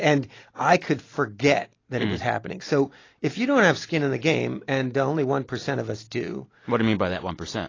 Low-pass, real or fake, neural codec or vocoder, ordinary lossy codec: 7.2 kHz; real; none; AAC, 32 kbps